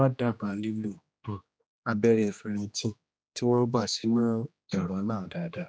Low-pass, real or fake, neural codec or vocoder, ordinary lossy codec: none; fake; codec, 16 kHz, 1 kbps, X-Codec, HuBERT features, trained on general audio; none